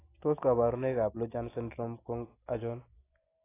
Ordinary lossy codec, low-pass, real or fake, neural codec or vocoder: AAC, 16 kbps; 3.6 kHz; real; none